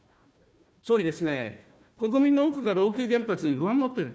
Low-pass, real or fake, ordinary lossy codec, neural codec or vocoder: none; fake; none; codec, 16 kHz, 1 kbps, FunCodec, trained on Chinese and English, 50 frames a second